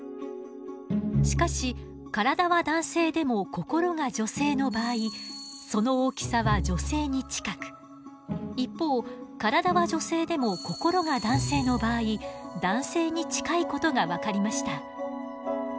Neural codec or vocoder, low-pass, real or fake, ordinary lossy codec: none; none; real; none